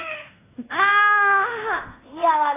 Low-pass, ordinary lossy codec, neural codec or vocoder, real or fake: 3.6 kHz; AAC, 16 kbps; codec, 16 kHz in and 24 kHz out, 0.9 kbps, LongCat-Audio-Codec, fine tuned four codebook decoder; fake